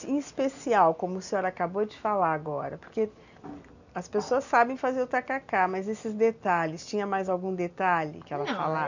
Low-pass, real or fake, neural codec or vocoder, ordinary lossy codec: 7.2 kHz; real; none; none